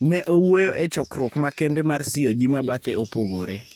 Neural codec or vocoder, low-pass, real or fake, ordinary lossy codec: codec, 44.1 kHz, 2.6 kbps, DAC; none; fake; none